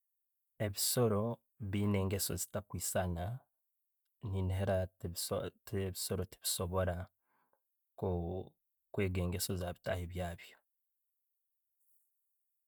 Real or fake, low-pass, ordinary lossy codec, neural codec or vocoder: real; none; none; none